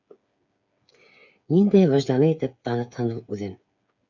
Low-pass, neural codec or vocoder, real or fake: 7.2 kHz; codec, 16 kHz, 8 kbps, FreqCodec, smaller model; fake